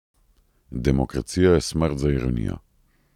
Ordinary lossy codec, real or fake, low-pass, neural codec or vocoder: none; real; 19.8 kHz; none